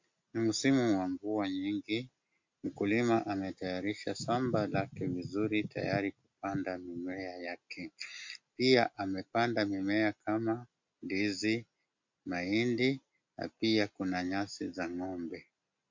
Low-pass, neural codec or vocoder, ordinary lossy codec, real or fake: 7.2 kHz; none; MP3, 48 kbps; real